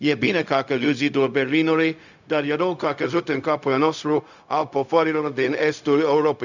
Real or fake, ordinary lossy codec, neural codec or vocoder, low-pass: fake; none; codec, 16 kHz, 0.4 kbps, LongCat-Audio-Codec; 7.2 kHz